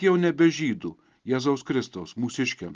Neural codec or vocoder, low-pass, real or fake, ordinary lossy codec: none; 7.2 kHz; real; Opus, 32 kbps